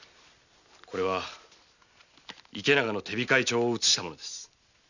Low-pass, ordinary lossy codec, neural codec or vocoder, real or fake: 7.2 kHz; none; none; real